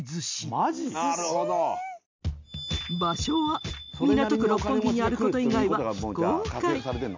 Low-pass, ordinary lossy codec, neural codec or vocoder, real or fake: 7.2 kHz; MP3, 64 kbps; none; real